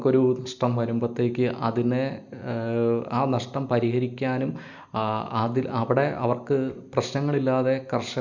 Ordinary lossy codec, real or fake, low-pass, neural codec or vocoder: MP3, 64 kbps; real; 7.2 kHz; none